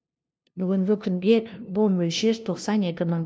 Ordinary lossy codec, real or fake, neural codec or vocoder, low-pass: none; fake; codec, 16 kHz, 0.5 kbps, FunCodec, trained on LibriTTS, 25 frames a second; none